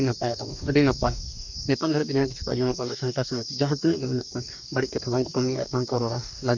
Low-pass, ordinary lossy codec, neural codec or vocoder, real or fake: 7.2 kHz; none; codec, 44.1 kHz, 2.6 kbps, DAC; fake